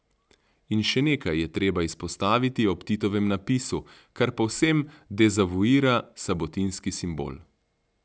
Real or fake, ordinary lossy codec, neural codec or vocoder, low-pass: real; none; none; none